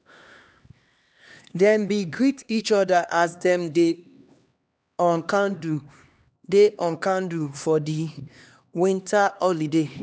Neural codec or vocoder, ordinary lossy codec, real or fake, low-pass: codec, 16 kHz, 2 kbps, X-Codec, HuBERT features, trained on LibriSpeech; none; fake; none